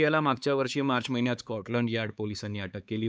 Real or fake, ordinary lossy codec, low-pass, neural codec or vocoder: fake; none; none; codec, 16 kHz, 4 kbps, X-Codec, HuBERT features, trained on LibriSpeech